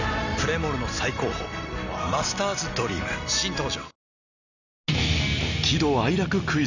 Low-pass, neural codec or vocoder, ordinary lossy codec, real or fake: 7.2 kHz; none; none; real